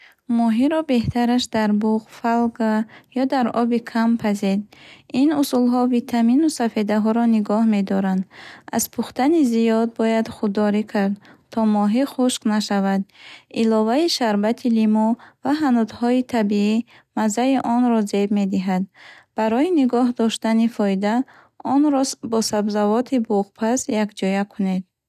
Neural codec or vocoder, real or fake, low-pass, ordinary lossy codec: none; real; 14.4 kHz; none